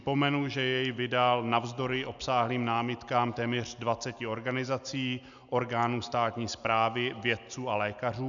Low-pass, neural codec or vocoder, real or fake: 7.2 kHz; none; real